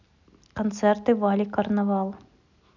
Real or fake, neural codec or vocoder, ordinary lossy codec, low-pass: real; none; none; 7.2 kHz